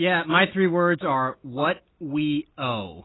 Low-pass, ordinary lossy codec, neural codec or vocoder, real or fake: 7.2 kHz; AAC, 16 kbps; none; real